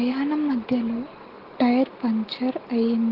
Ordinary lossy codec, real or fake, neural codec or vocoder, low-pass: Opus, 16 kbps; real; none; 5.4 kHz